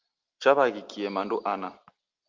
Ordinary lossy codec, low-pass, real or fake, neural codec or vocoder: Opus, 24 kbps; 7.2 kHz; real; none